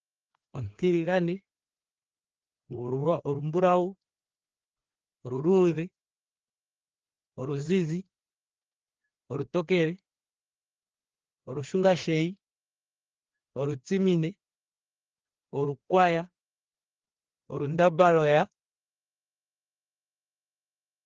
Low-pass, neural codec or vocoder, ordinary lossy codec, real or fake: 7.2 kHz; codec, 16 kHz, 2 kbps, FreqCodec, larger model; Opus, 16 kbps; fake